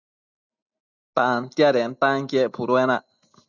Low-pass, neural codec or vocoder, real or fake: 7.2 kHz; none; real